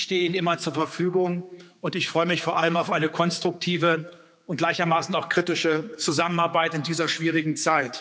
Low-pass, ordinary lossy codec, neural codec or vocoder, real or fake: none; none; codec, 16 kHz, 4 kbps, X-Codec, HuBERT features, trained on general audio; fake